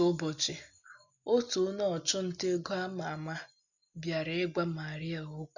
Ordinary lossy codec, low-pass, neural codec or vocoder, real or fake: none; 7.2 kHz; none; real